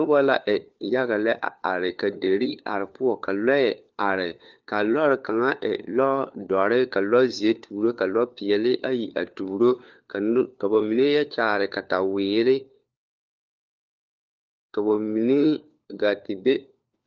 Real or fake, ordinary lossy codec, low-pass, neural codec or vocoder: fake; Opus, 32 kbps; 7.2 kHz; codec, 16 kHz, 4 kbps, FunCodec, trained on LibriTTS, 50 frames a second